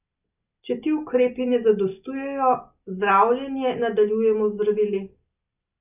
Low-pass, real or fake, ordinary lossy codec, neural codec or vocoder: 3.6 kHz; real; none; none